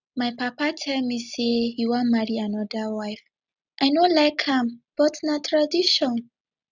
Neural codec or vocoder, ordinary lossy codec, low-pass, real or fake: none; none; 7.2 kHz; real